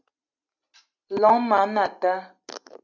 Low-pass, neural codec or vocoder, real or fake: 7.2 kHz; none; real